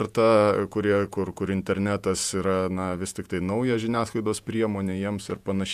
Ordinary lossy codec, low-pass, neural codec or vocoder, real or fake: AAC, 96 kbps; 14.4 kHz; vocoder, 48 kHz, 128 mel bands, Vocos; fake